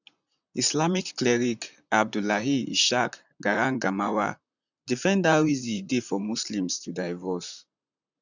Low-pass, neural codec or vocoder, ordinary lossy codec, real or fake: 7.2 kHz; vocoder, 44.1 kHz, 128 mel bands, Pupu-Vocoder; none; fake